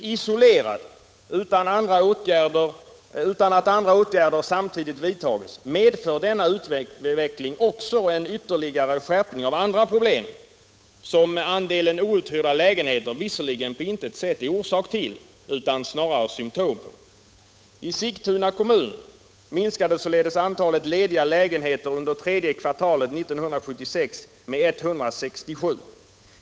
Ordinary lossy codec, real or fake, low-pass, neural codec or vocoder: none; fake; none; codec, 16 kHz, 8 kbps, FunCodec, trained on Chinese and English, 25 frames a second